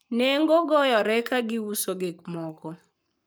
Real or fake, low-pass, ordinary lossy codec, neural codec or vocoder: fake; none; none; codec, 44.1 kHz, 7.8 kbps, Pupu-Codec